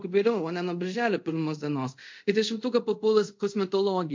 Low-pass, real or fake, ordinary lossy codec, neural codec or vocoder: 7.2 kHz; fake; MP3, 48 kbps; codec, 24 kHz, 0.5 kbps, DualCodec